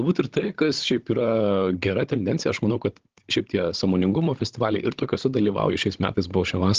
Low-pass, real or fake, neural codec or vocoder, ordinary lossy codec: 7.2 kHz; fake; codec, 16 kHz, 16 kbps, FunCodec, trained on Chinese and English, 50 frames a second; Opus, 16 kbps